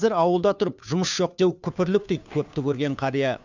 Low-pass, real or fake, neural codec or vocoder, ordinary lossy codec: 7.2 kHz; fake; codec, 16 kHz, 2 kbps, FunCodec, trained on Chinese and English, 25 frames a second; none